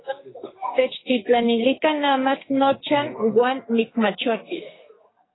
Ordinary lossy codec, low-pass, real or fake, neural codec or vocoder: AAC, 16 kbps; 7.2 kHz; fake; codec, 44.1 kHz, 2.6 kbps, SNAC